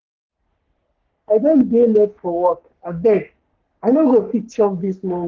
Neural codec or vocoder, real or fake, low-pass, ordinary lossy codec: codec, 44.1 kHz, 3.4 kbps, Pupu-Codec; fake; 7.2 kHz; Opus, 16 kbps